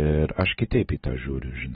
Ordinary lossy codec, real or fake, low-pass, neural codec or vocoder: AAC, 16 kbps; real; 19.8 kHz; none